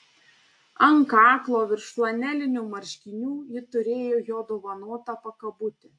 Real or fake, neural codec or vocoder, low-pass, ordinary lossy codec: real; none; 9.9 kHz; AAC, 48 kbps